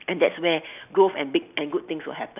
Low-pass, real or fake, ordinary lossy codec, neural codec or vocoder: 3.6 kHz; real; none; none